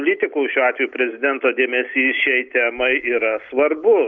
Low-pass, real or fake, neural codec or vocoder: 7.2 kHz; real; none